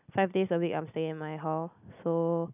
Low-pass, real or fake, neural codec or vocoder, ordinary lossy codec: 3.6 kHz; real; none; none